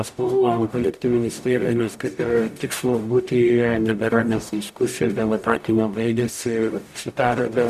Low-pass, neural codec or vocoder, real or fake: 14.4 kHz; codec, 44.1 kHz, 0.9 kbps, DAC; fake